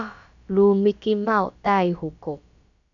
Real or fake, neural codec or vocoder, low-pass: fake; codec, 16 kHz, about 1 kbps, DyCAST, with the encoder's durations; 7.2 kHz